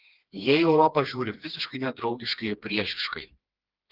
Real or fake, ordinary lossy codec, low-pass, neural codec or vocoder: fake; Opus, 24 kbps; 5.4 kHz; codec, 16 kHz, 2 kbps, FreqCodec, smaller model